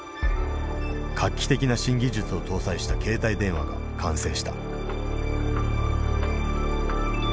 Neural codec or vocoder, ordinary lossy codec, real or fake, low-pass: none; none; real; none